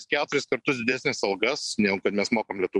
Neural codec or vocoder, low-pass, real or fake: vocoder, 44.1 kHz, 128 mel bands every 256 samples, BigVGAN v2; 10.8 kHz; fake